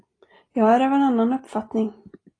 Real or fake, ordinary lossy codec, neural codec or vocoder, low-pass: real; AAC, 32 kbps; none; 9.9 kHz